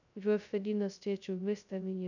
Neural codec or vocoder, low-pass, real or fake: codec, 16 kHz, 0.2 kbps, FocalCodec; 7.2 kHz; fake